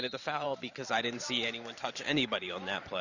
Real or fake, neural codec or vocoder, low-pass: fake; vocoder, 44.1 kHz, 128 mel bands every 512 samples, BigVGAN v2; 7.2 kHz